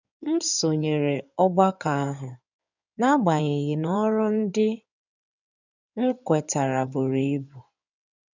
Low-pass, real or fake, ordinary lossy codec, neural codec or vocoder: 7.2 kHz; fake; none; codec, 16 kHz in and 24 kHz out, 2.2 kbps, FireRedTTS-2 codec